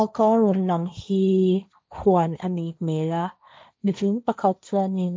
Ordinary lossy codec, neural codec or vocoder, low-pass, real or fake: none; codec, 16 kHz, 1.1 kbps, Voila-Tokenizer; none; fake